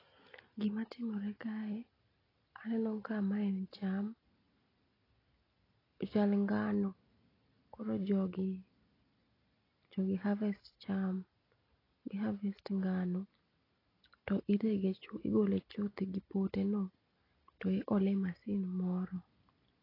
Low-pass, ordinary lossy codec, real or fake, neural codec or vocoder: 5.4 kHz; AAC, 24 kbps; real; none